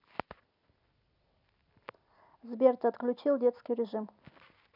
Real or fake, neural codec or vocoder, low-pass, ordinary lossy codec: real; none; 5.4 kHz; none